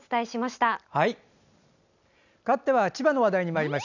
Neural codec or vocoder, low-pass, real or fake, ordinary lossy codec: none; 7.2 kHz; real; none